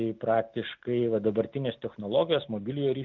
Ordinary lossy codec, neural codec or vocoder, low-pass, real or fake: Opus, 24 kbps; none; 7.2 kHz; real